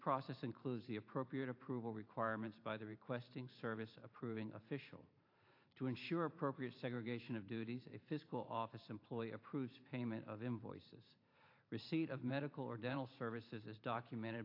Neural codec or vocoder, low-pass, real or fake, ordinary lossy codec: vocoder, 44.1 kHz, 128 mel bands every 512 samples, BigVGAN v2; 5.4 kHz; fake; AAC, 32 kbps